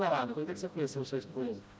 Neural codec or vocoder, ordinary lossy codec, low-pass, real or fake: codec, 16 kHz, 0.5 kbps, FreqCodec, smaller model; none; none; fake